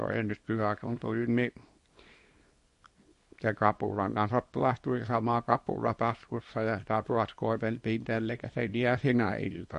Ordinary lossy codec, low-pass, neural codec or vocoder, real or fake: MP3, 48 kbps; 10.8 kHz; codec, 24 kHz, 0.9 kbps, WavTokenizer, small release; fake